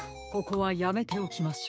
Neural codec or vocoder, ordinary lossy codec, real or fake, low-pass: codec, 16 kHz, 6 kbps, DAC; none; fake; none